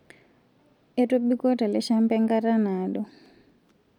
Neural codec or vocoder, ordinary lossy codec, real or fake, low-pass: none; none; real; 19.8 kHz